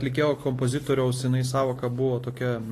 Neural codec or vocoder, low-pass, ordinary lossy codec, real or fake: none; 14.4 kHz; AAC, 48 kbps; real